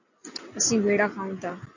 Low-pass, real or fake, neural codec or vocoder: 7.2 kHz; real; none